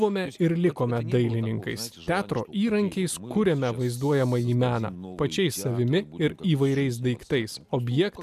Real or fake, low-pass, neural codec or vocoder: real; 14.4 kHz; none